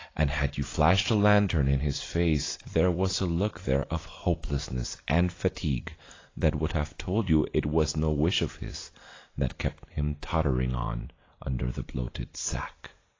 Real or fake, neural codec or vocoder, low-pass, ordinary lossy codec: real; none; 7.2 kHz; AAC, 32 kbps